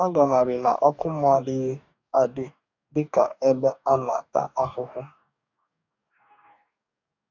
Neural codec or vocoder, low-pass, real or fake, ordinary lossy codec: codec, 44.1 kHz, 2.6 kbps, DAC; 7.2 kHz; fake; none